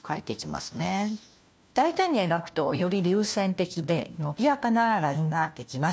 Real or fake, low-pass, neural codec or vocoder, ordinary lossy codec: fake; none; codec, 16 kHz, 1 kbps, FunCodec, trained on LibriTTS, 50 frames a second; none